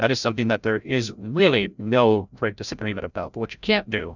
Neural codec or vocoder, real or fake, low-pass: codec, 16 kHz, 0.5 kbps, FreqCodec, larger model; fake; 7.2 kHz